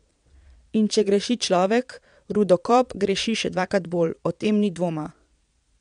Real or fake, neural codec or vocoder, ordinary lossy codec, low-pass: fake; vocoder, 22.05 kHz, 80 mel bands, Vocos; none; 9.9 kHz